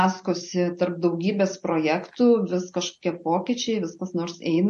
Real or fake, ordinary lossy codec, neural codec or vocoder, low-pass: real; MP3, 48 kbps; none; 14.4 kHz